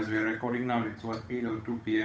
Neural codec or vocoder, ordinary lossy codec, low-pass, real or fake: codec, 16 kHz, 8 kbps, FunCodec, trained on Chinese and English, 25 frames a second; none; none; fake